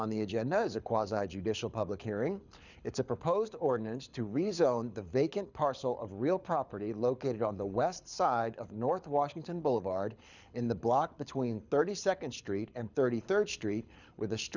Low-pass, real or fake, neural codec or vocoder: 7.2 kHz; fake; codec, 24 kHz, 6 kbps, HILCodec